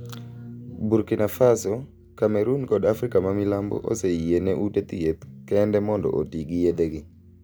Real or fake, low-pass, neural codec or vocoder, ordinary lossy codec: real; none; none; none